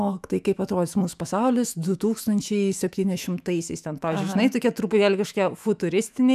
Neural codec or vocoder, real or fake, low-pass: autoencoder, 48 kHz, 128 numbers a frame, DAC-VAE, trained on Japanese speech; fake; 14.4 kHz